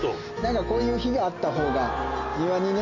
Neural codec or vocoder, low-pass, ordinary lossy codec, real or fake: none; 7.2 kHz; AAC, 48 kbps; real